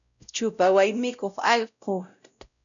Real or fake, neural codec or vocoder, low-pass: fake; codec, 16 kHz, 0.5 kbps, X-Codec, WavLM features, trained on Multilingual LibriSpeech; 7.2 kHz